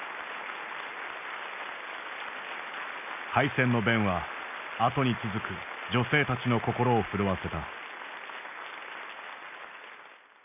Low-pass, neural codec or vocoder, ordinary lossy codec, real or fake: 3.6 kHz; none; none; real